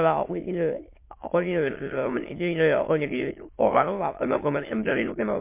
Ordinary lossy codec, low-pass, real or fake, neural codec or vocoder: MP3, 24 kbps; 3.6 kHz; fake; autoencoder, 22.05 kHz, a latent of 192 numbers a frame, VITS, trained on many speakers